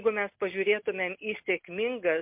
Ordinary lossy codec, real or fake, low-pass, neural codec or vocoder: MP3, 32 kbps; real; 3.6 kHz; none